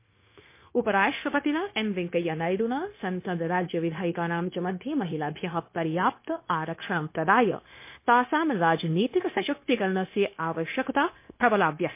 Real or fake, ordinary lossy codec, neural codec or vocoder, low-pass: fake; MP3, 24 kbps; codec, 16 kHz, 0.9 kbps, LongCat-Audio-Codec; 3.6 kHz